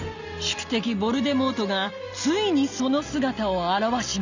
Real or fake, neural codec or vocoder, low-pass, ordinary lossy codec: real; none; 7.2 kHz; none